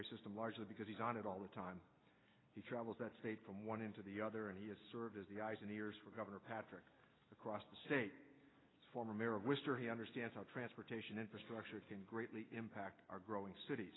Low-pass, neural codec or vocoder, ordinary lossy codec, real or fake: 7.2 kHz; none; AAC, 16 kbps; real